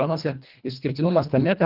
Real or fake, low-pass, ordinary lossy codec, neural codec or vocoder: fake; 5.4 kHz; Opus, 24 kbps; codec, 24 kHz, 1.5 kbps, HILCodec